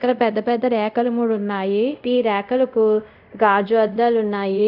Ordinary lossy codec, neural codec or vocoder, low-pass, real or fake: none; codec, 24 kHz, 0.5 kbps, DualCodec; 5.4 kHz; fake